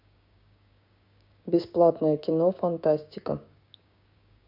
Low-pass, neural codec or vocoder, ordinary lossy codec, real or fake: 5.4 kHz; codec, 16 kHz, 6 kbps, DAC; none; fake